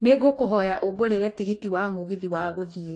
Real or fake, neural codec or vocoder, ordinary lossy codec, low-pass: fake; codec, 44.1 kHz, 2.6 kbps, DAC; AAC, 48 kbps; 10.8 kHz